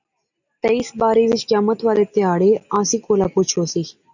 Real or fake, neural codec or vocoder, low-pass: real; none; 7.2 kHz